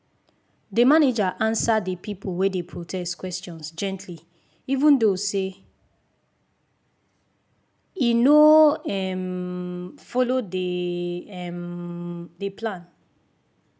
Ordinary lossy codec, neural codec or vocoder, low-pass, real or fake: none; none; none; real